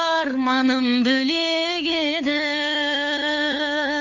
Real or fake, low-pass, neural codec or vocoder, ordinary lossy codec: fake; 7.2 kHz; codec, 16 kHz, 4 kbps, FunCodec, trained on LibriTTS, 50 frames a second; none